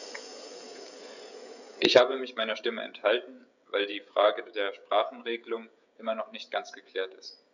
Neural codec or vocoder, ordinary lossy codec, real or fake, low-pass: codec, 16 kHz, 16 kbps, FreqCodec, smaller model; none; fake; 7.2 kHz